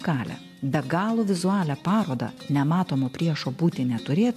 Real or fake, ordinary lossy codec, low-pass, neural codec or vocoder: real; MP3, 64 kbps; 14.4 kHz; none